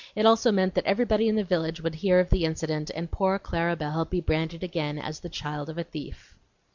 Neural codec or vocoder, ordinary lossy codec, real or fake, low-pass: none; MP3, 64 kbps; real; 7.2 kHz